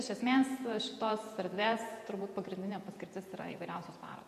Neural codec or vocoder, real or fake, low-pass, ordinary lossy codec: vocoder, 48 kHz, 128 mel bands, Vocos; fake; 14.4 kHz; MP3, 64 kbps